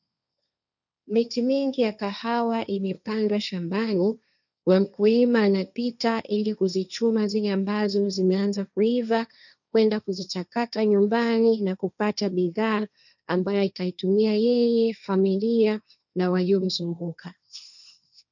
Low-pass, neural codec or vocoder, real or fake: 7.2 kHz; codec, 16 kHz, 1.1 kbps, Voila-Tokenizer; fake